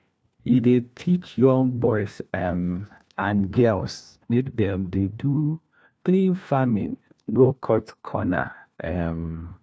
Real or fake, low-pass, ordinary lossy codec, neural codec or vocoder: fake; none; none; codec, 16 kHz, 1 kbps, FunCodec, trained on LibriTTS, 50 frames a second